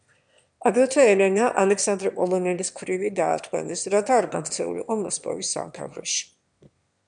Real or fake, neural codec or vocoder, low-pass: fake; autoencoder, 22.05 kHz, a latent of 192 numbers a frame, VITS, trained on one speaker; 9.9 kHz